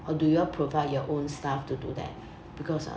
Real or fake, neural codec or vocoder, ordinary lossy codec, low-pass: real; none; none; none